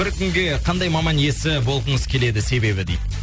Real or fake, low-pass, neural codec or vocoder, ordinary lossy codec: real; none; none; none